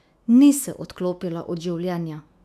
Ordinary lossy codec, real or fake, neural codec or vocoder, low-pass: none; fake; autoencoder, 48 kHz, 128 numbers a frame, DAC-VAE, trained on Japanese speech; 14.4 kHz